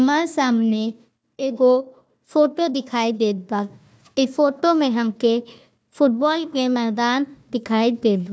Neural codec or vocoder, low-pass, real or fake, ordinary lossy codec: codec, 16 kHz, 1 kbps, FunCodec, trained on Chinese and English, 50 frames a second; none; fake; none